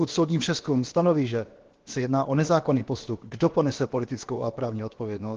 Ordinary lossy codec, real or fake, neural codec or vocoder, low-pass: Opus, 16 kbps; fake; codec, 16 kHz, about 1 kbps, DyCAST, with the encoder's durations; 7.2 kHz